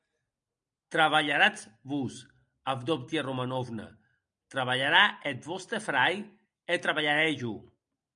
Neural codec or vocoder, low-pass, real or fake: none; 9.9 kHz; real